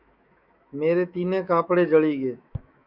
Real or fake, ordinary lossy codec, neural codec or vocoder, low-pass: fake; Opus, 64 kbps; autoencoder, 48 kHz, 128 numbers a frame, DAC-VAE, trained on Japanese speech; 5.4 kHz